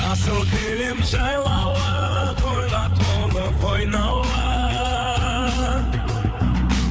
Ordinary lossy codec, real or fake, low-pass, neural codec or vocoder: none; fake; none; codec, 16 kHz, 4 kbps, FreqCodec, larger model